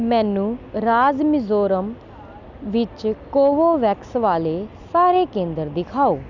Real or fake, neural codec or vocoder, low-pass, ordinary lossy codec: real; none; 7.2 kHz; none